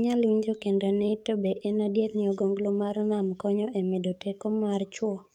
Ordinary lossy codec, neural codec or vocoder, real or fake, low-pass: none; codec, 44.1 kHz, 7.8 kbps, DAC; fake; 19.8 kHz